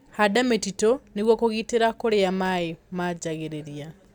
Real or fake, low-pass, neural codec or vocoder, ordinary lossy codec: real; 19.8 kHz; none; none